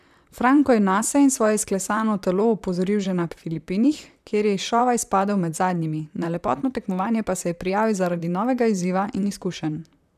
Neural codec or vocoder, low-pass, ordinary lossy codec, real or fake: vocoder, 44.1 kHz, 128 mel bands, Pupu-Vocoder; 14.4 kHz; none; fake